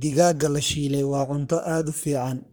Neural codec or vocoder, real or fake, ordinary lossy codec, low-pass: codec, 44.1 kHz, 3.4 kbps, Pupu-Codec; fake; none; none